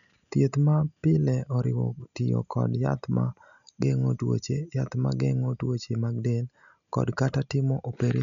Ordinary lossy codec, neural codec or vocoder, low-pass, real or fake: none; none; 7.2 kHz; real